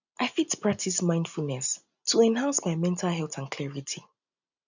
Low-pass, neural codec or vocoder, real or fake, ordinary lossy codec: 7.2 kHz; none; real; none